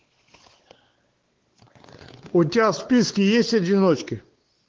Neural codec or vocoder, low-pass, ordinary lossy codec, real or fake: codec, 16 kHz, 4 kbps, X-Codec, WavLM features, trained on Multilingual LibriSpeech; 7.2 kHz; Opus, 16 kbps; fake